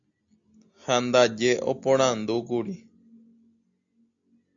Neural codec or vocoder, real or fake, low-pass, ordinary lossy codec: none; real; 7.2 kHz; AAC, 64 kbps